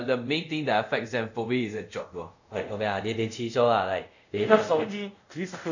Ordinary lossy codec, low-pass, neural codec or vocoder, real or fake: none; 7.2 kHz; codec, 24 kHz, 0.5 kbps, DualCodec; fake